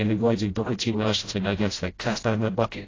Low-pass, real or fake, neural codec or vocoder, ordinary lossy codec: 7.2 kHz; fake; codec, 16 kHz, 0.5 kbps, FreqCodec, smaller model; AAC, 32 kbps